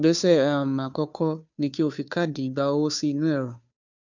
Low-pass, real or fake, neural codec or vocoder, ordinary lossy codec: 7.2 kHz; fake; codec, 16 kHz, 2 kbps, FunCodec, trained on Chinese and English, 25 frames a second; none